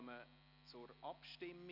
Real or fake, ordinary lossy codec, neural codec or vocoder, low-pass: real; none; none; 5.4 kHz